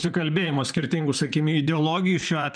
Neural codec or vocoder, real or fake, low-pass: vocoder, 44.1 kHz, 128 mel bands, Pupu-Vocoder; fake; 9.9 kHz